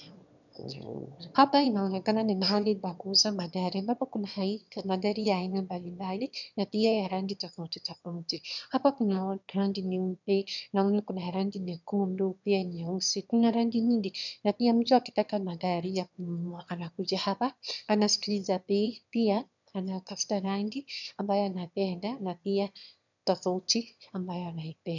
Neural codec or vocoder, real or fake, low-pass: autoencoder, 22.05 kHz, a latent of 192 numbers a frame, VITS, trained on one speaker; fake; 7.2 kHz